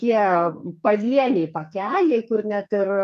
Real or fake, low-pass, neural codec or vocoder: fake; 14.4 kHz; codec, 44.1 kHz, 2.6 kbps, SNAC